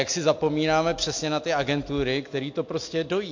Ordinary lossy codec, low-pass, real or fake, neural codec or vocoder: MP3, 48 kbps; 7.2 kHz; real; none